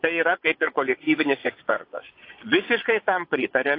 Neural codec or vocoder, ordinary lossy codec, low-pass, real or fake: vocoder, 22.05 kHz, 80 mel bands, Vocos; AAC, 32 kbps; 5.4 kHz; fake